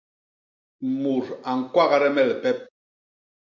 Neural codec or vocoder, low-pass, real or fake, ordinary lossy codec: none; 7.2 kHz; real; MP3, 64 kbps